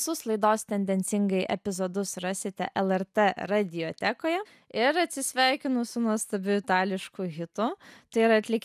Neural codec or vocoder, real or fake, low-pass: none; real; 14.4 kHz